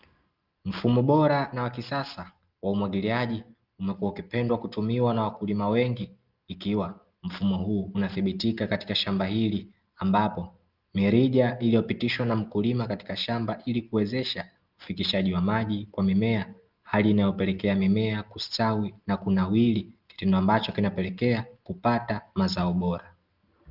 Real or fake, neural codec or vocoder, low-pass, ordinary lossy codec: real; none; 5.4 kHz; Opus, 32 kbps